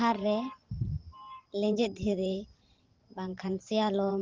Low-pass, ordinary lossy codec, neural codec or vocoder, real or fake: 7.2 kHz; Opus, 16 kbps; vocoder, 44.1 kHz, 128 mel bands every 512 samples, BigVGAN v2; fake